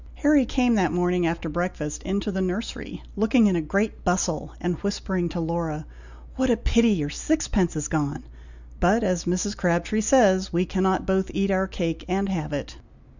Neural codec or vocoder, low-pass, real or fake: none; 7.2 kHz; real